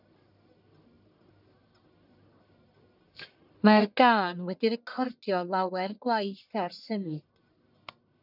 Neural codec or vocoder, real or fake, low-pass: codec, 44.1 kHz, 1.7 kbps, Pupu-Codec; fake; 5.4 kHz